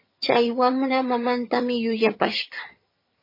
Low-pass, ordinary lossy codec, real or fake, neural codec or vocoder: 5.4 kHz; MP3, 24 kbps; fake; vocoder, 22.05 kHz, 80 mel bands, HiFi-GAN